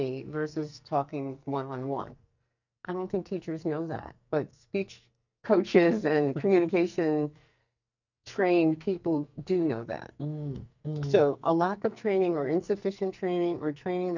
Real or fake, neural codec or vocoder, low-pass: fake; codec, 44.1 kHz, 2.6 kbps, SNAC; 7.2 kHz